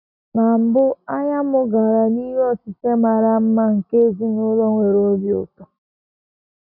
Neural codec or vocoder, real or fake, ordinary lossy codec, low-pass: none; real; none; 5.4 kHz